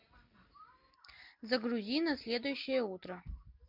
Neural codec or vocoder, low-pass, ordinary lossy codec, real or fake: none; 5.4 kHz; MP3, 48 kbps; real